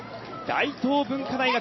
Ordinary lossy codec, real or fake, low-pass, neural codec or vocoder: MP3, 24 kbps; real; 7.2 kHz; none